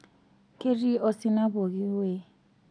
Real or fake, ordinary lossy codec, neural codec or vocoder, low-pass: real; none; none; 9.9 kHz